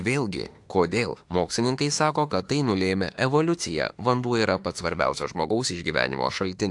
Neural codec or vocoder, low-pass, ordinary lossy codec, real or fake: autoencoder, 48 kHz, 32 numbers a frame, DAC-VAE, trained on Japanese speech; 10.8 kHz; AAC, 64 kbps; fake